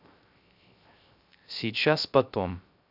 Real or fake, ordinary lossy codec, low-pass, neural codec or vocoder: fake; none; 5.4 kHz; codec, 16 kHz, 0.3 kbps, FocalCodec